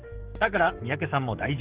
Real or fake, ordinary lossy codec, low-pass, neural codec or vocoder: fake; Opus, 16 kbps; 3.6 kHz; codec, 44.1 kHz, 7.8 kbps, DAC